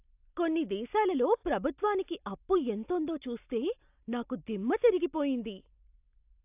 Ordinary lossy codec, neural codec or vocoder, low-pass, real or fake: AAC, 32 kbps; none; 3.6 kHz; real